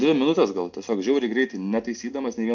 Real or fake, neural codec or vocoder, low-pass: real; none; 7.2 kHz